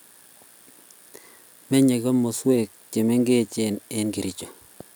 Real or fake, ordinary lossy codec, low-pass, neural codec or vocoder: real; none; none; none